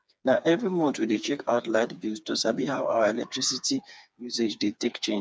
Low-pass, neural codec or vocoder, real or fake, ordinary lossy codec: none; codec, 16 kHz, 4 kbps, FreqCodec, smaller model; fake; none